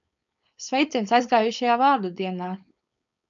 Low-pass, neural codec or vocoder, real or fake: 7.2 kHz; codec, 16 kHz, 4.8 kbps, FACodec; fake